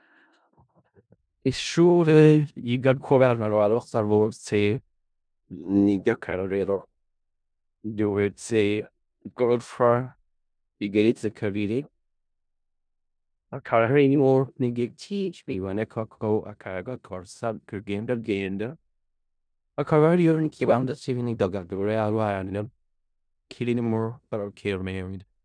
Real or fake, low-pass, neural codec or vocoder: fake; 9.9 kHz; codec, 16 kHz in and 24 kHz out, 0.4 kbps, LongCat-Audio-Codec, four codebook decoder